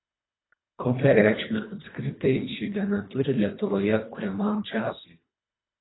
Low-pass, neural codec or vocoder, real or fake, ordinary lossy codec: 7.2 kHz; codec, 24 kHz, 1.5 kbps, HILCodec; fake; AAC, 16 kbps